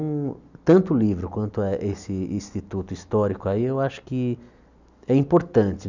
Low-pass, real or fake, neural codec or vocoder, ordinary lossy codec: 7.2 kHz; real; none; none